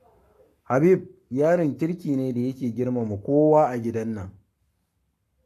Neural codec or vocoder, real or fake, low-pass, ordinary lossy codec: codec, 44.1 kHz, 7.8 kbps, Pupu-Codec; fake; 14.4 kHz; AAC, 64 kbps